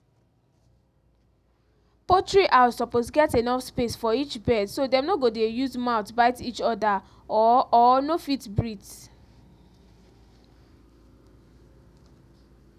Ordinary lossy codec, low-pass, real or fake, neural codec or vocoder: none; 14.4 kHz; real; none